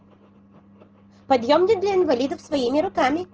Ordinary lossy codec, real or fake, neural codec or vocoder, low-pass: Opus, 16 kbps; real; none; 7.2 kHz